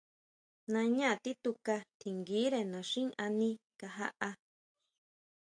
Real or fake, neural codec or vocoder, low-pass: real; none; 9.9 kHz